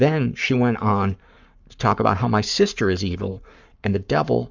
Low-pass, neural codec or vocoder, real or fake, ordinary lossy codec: 7.2 kHz; codec, 44.1 kHz, 7.8 kbps, Pupu-Codec; fake; Opus, 64 kbps